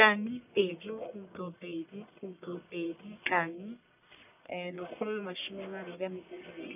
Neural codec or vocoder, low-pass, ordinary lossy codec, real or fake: codec, 44.1 kHz, 1.7 kbps, Pupu-Codec; 3.6 kHz; none; fake